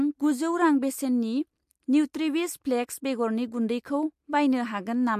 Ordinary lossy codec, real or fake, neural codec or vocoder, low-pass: MP3, 64 kbps; real; none; 14.4 kHz